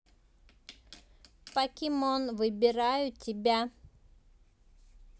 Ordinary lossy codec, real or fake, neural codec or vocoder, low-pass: none; real; none; none